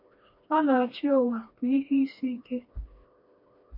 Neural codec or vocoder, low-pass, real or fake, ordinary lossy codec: codec, 16 kHz, 2 kbps, FreqCodec, smaller model; 5.4 kHz; fake; MP3, 32 kbps